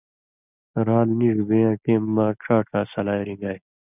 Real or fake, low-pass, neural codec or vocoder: real; 3.6 kHz; none